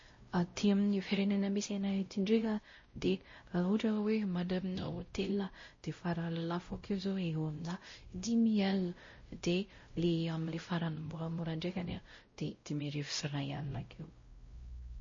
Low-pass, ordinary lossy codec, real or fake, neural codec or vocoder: 7.2 kHz; MP3, 32 kbps; fake; codec, 16 kHz, 0.5 kbps, X-Codec, WavLM features, trained on Multilingual LibriSpeech